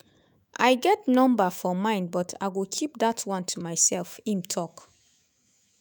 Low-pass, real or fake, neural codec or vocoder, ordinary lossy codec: none; fake; autoencoder, 48 kHz, 128 numbers a frame, DAC-VAE, trained on Japanese speech; none